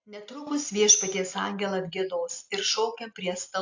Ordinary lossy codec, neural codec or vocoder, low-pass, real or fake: AAC, 48 kbps; none; 7.2 kHz; real